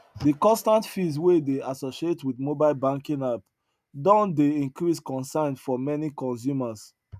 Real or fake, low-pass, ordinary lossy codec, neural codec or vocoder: real; 14.4 kHz; none; none